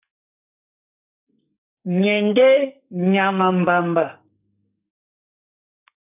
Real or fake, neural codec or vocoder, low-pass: fake; codec, 44.1 kHz, 2.6 kbps, SNAC; 3.6 kHz